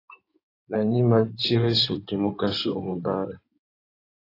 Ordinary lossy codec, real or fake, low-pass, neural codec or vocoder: AAC, 32 kbps; fake; 5.4 kHz; codec, 16 kHz in and 24 kHz out, 2.2 kbps, FireRedTTS-2 codec